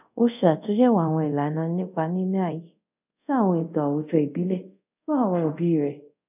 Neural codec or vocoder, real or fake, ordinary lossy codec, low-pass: codec, 24 kHz, 0.5 kbps, DualCodec; fake; none; 3.6 kHz